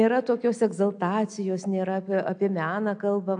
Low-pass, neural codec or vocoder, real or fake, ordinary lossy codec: 9.9 kHz; none; real; AAC, 64 kbps